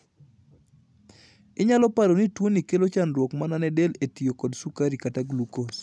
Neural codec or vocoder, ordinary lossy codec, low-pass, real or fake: none; none; none; real